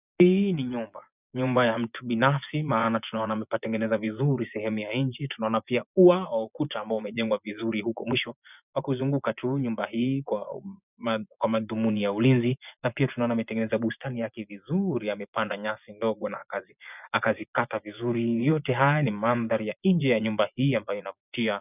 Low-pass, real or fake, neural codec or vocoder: 3.6 kHz; fake; vocoder, 24 kHz, 100 mel bands, Vocos